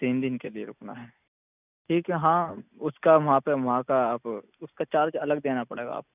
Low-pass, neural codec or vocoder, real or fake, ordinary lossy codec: 3.6 kHz; none; real; none